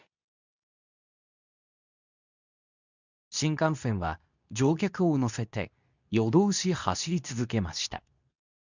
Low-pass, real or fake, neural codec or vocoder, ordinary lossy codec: 7.2 kHz; fake; codec, 24 kHz, 0.9 kbps, WavTokenizer, medium speech release version 2; none